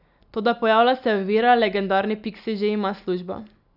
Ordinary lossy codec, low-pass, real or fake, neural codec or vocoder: none; 5.4 kHz; real; none